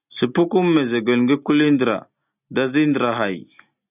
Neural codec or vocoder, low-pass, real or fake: none; 3.6 kHz; real